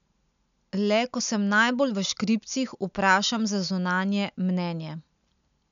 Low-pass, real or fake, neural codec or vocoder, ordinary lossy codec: 7.2 kHz; real; none; none